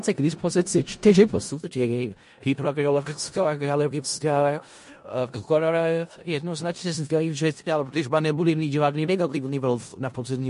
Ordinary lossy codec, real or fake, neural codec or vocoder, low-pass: MP3, 48 kbps; fake; codec, 16 kHz in and 24 kHz out, 0.4 kbps, LongCat-Audio-Codec, four codebook decoder; 10.8 kHz